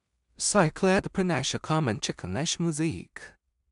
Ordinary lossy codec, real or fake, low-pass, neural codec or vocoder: none; fake; 10.8 kHz; codec, 16 kHz in and 24 kHz out, 0.4 kbps, LongCat-Audio-Codec, two codebook decoder